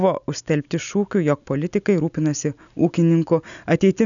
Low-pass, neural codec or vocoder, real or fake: 7.2 kHz; none; real